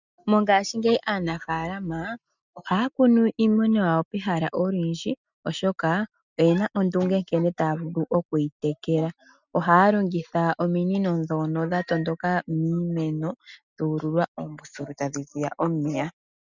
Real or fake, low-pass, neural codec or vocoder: real; 7.2 kHz; none